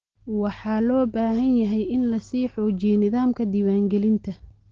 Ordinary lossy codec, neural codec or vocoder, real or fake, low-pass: Opus, 16 kbps; none; real; 7.2 kHz